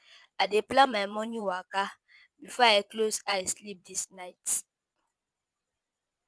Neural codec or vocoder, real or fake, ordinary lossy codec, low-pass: vocoder, 22.05 kHz, 80 mel bands, Vocos; fake; none; none